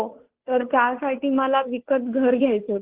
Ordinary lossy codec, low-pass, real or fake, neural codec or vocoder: Opus, 16 kbps; 3.6 kHz; fake; codec, 16 kHz, 2 kbps, FunCodec, trained on Chinese and English, 25 frames a second